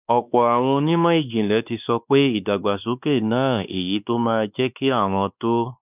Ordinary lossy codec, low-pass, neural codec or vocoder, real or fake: none; 3.6 kHz; codec, 16 kHz, 2 kbps, X-Codec, WavLM features, trained on Multilingual LibriSpeech; fake